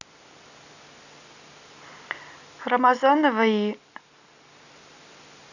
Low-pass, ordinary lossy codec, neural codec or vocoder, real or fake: 7.2 kHz; none; none; real